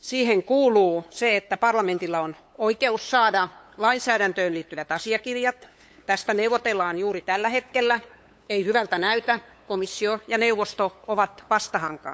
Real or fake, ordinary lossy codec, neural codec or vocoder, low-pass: fake; none; codec, 16 kHz, 8 kbps, FunCodec, trained on LibriTTS, 25 frames a second; none